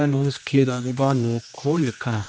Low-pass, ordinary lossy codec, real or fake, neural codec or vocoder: none; none; fake; codec, 16 kHz, 1 kbps, X-Codec, HuBERT features, trained on general audio